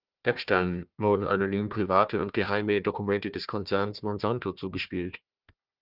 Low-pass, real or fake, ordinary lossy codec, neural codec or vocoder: 5.4 kHz; fake; Opus, 24 kbps; codec, 16 kHz, 1 kbps, FunCodec, trained on Chinese and English, 50 frames a second